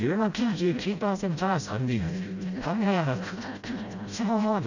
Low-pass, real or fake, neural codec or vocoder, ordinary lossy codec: 7.2 kHz; fake; codec, 16 kHz, 0.5 kbps, FreqCodec, smaller model; none